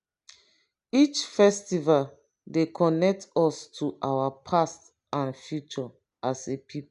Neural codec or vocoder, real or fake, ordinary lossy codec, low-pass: none; real; none; 10.8 kHz